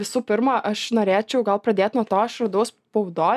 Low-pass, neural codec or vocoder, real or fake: 14.4 kHz; none; real